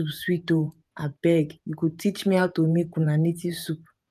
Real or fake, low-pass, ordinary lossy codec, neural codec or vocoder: fake; 14.4 kHz; none; vocoder, 48 kHz, 128 mel bands, Vocos